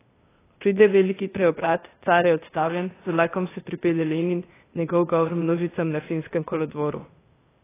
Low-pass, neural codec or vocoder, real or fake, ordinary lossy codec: 3.6 kHz; codec, 16 kHz, 0.8 kbps, ZipCodec; fake; AAC, 16 kbps